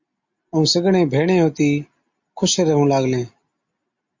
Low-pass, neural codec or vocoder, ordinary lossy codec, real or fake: 7.2 kHz; none; MP3, 48 kbps; real